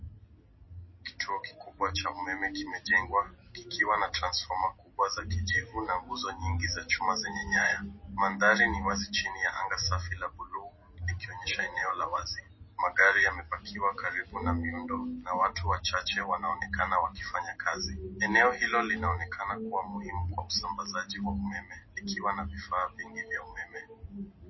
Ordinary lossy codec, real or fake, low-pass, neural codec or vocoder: MP3, 24 kbps; real; 7.2 kHz; none